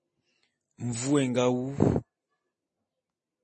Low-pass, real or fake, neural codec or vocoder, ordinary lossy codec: 10.8 kHz; real; none; MP3, 32 kbps